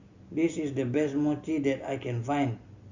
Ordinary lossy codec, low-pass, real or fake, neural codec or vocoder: Opus, 64 kbps; 7.2 kHz; real; none